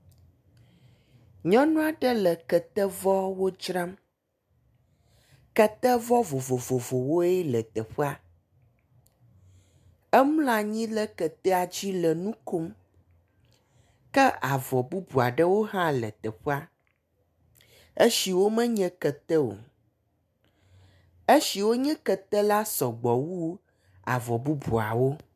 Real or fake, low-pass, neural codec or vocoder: real; 14.4 kHz; none